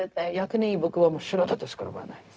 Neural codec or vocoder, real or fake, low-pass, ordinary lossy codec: codec, 16 kHz, 0.4 kbps, LongCat-Audio-Codec; fake; none; none